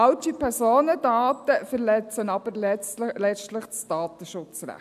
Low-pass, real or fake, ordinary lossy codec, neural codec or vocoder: 14.4 kHz; real; none; none